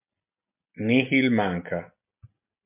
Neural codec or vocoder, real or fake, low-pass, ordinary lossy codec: none; real; 3.6 kHz; MP3, 32 kbps